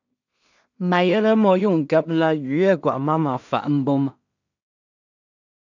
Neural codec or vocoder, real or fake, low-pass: codec, 16 kHz in and 24 kHz out, 0.4 kbps, LongCat-Audio-Codec, two codebook decoder; fake; 7.2 kHz